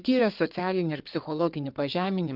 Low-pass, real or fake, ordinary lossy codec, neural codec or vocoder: 5.4 kHz; fake; Opus, 24 kbps; codec, 16 kHz in and 24 kHz out, 2.2 kbps, FireRedTTS-2 codec